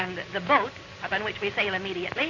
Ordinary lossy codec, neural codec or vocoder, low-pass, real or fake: AAC, 32 kbps; none; 7.2 kHz; real